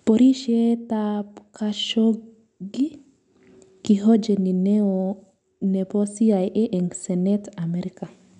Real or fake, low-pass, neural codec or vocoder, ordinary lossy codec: real; 10.8 kHz; none; none